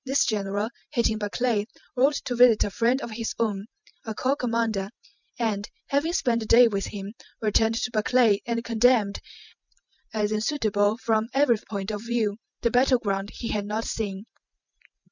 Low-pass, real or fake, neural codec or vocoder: 7.2 kHz; real; none